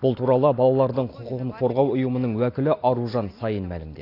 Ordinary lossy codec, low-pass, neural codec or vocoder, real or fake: AAC, 32 kbps; 5.4 kHz; none; real